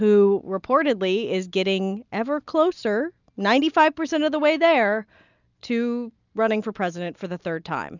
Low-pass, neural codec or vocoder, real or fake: 7.2 kHz; none; real